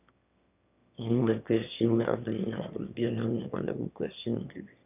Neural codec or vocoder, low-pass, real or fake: autoencoder, 22.05 kHz, a latent of 192 numbers a frame, VITS, trained on one speaker; 3.6 kHz; fake